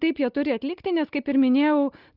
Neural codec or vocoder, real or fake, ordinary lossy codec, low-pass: none; real; Opus, 32 kbps; 5.4 kHz